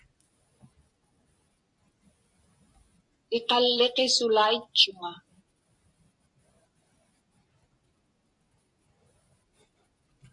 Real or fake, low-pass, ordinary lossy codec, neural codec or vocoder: real; 10.8 kHz; MP3, 64 kbps; none